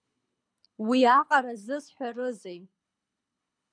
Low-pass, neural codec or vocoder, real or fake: 9.9 kHz; codec, 24 kHz, 6 kbps, HILCodec; fake